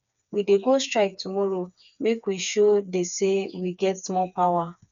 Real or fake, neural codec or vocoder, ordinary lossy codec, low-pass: fake; codec, 16 kHz, 4 kbps, FreqCodec, smaller model; none; 7.2 kHz